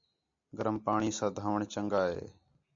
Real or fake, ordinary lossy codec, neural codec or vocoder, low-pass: real; MP3, 48 kbps; none; 7.2 kHz